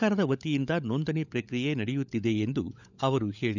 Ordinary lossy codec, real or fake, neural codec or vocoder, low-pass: none; fake; codec, 16 kHz, 16 kbps, FunCodec, trained on LibriTTS, 50 frames a second; 7.2 kHz